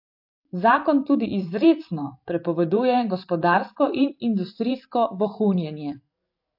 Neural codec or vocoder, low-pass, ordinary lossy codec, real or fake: vocoder, 44.1 kHz, 128 mel bands every 512 samples, BigVGAN v2; 5.4 kHz; AAC, 48 kbps; fake